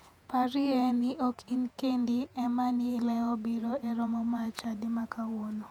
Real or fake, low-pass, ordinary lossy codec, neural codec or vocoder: fake; 19.8 kHz; none; vocoder, 48 kHz, 128 mel bands, Vocos